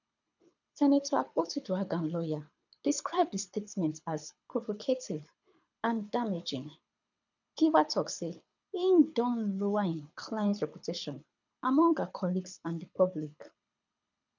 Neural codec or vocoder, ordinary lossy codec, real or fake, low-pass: codec, 24 kHz, 6 kbps, HILCodec; none; fake; 7.2 kHz